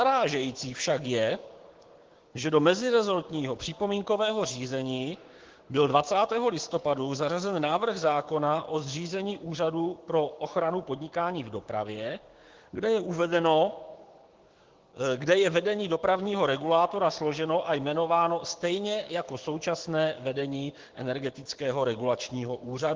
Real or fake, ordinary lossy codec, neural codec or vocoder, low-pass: fake; Opus, 16 kbps; codec, 16 kHz, 6 kbps, DAC; 7.2 kHz